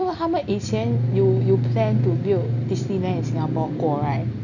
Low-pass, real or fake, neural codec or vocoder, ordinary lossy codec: 7.2 kHz; real; none; none